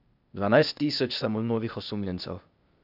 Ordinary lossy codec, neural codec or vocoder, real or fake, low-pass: none; codec, 16 kHz, 0.8 kbps, ZipCodec; fake; 5.4 kHz